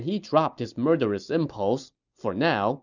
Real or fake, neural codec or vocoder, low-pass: real; none; 7.2 kHz